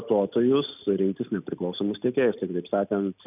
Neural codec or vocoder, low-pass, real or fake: none; 3.6 kHz; real